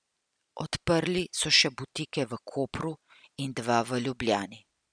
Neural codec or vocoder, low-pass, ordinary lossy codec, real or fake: none; 9.9 kHz; none; real